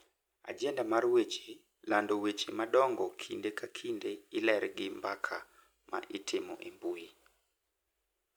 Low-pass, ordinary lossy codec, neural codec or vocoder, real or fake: none; none; none; real